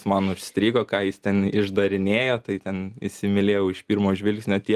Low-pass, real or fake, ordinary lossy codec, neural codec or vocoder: 14.4 kHz; real; Opus, 32 kbps; none